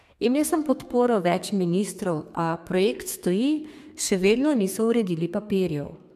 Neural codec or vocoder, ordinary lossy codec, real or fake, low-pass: codec, 32 kHz, 1.9 kbps, SNAC; AAC, 96 kbps; fake; 14.4 kHz